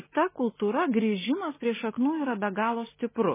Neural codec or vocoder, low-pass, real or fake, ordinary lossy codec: none; 3.6 kHz; real; MP3, 16 kbps